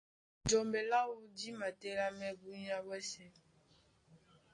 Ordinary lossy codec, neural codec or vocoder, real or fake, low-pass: AAC, 32 kbps; none; real; 9.9 kHz